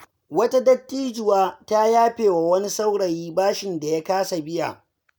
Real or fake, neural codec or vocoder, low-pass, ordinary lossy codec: real; none; none; none